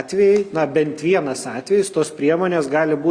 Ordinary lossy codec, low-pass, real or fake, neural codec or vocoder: Opus, 64 kbps; 9.9 kHz; real; none